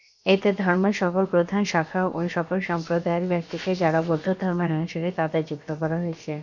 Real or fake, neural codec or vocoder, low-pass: fake; codec, 16 kHz, about 1 kbps, DyCAST, with the encoder's durations; 7.2 kHz